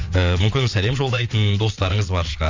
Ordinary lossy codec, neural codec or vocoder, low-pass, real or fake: MP3, 64 kbps; vocoder, 22.05 kHz, 80 mel bands, WaveNeXt; 7.2 kHz; fake